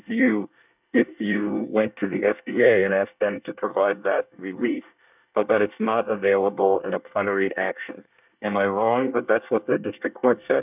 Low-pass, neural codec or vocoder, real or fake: 3.6 kHz; codec, 24 kHz, 1 kbps, SNAC; fake